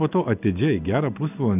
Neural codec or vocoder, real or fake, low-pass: none; real; 3.6 kHz